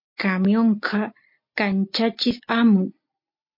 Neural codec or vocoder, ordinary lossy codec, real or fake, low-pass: none; MP3, 32 kbps; real; 5.4 kHz